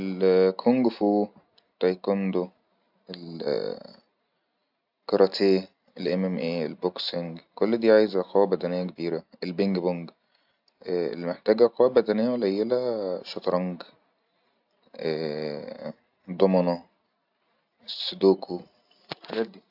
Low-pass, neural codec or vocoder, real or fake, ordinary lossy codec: 5.4 kHz; none; real; AAC, 48 kbps